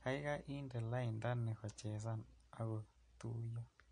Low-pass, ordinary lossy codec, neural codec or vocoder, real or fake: 9.9 kHz; MP3, 48 kbps; none; real